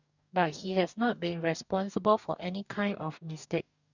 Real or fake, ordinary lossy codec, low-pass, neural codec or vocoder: fake; none; 7.2 kHz; codec, 44.1 kHz, 2.6 kbps, DAC